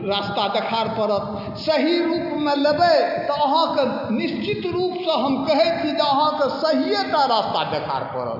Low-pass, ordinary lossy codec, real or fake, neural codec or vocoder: 5.4 kHz; AAC, 48 kbps; real; none